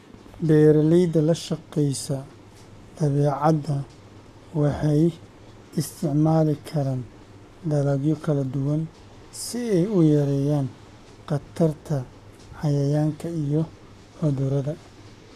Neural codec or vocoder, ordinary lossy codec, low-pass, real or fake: codec, 44.1 kHz, 7.8 kbps, Pupu-Codec; none; 14.4 kHz; fake